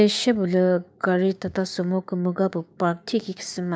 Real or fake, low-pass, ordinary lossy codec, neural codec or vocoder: fake; none; none; codec, 16 kHz, 6 kbps, DAC